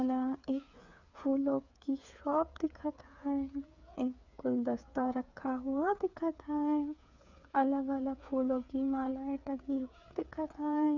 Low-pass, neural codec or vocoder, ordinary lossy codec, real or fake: 7.2 kHz; codec, 16 kHz, 8 kbps, FreqCodec, smaller model; none; fake